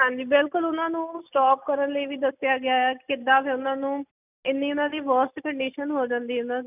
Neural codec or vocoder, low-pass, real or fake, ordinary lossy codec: none; 3.6 kHz; real; none